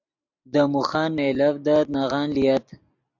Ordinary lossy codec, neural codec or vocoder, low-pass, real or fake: MP3, 64 kbps; none; 7.2 kHz; real